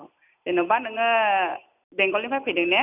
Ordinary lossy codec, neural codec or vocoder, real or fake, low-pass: none; none; real; 3.6 kHz